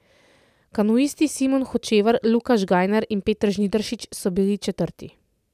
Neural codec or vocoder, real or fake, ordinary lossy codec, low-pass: none; real; none; 14.4 kHz